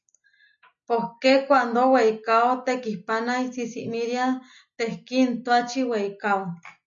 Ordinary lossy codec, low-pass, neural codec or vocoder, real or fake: MP3, 48 kbps; 7.2 kHz; none; real